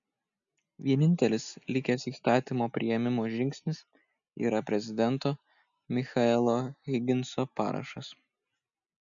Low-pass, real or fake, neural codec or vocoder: 7.2 kHz; real; none